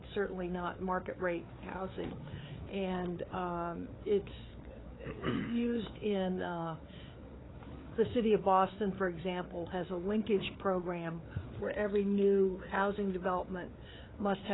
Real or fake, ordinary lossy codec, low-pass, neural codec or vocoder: fake; AAC, 16 kbps; 7.2 kHz; codec, 16 kHz, 4 kbps, FreqCodec, larger model